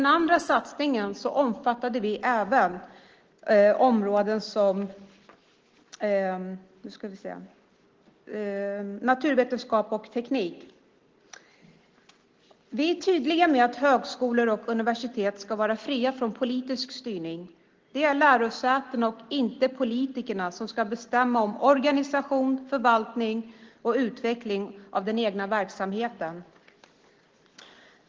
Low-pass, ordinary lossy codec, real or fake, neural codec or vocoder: 7.2 kHz; Opus, 16 kbps; real; none